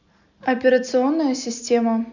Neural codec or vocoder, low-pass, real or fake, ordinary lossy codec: none; 7.2 kHz; real; none